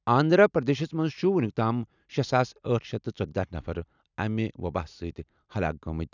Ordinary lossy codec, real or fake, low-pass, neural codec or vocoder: none; real; 7.2 kHz; none